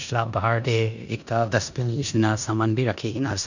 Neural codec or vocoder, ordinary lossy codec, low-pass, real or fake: codec, 16 kHz in and 24 kHz out, 0.9 kbps, LongCat-Audio-Codec, four codebook decoder; none; 7.2 kHz; fake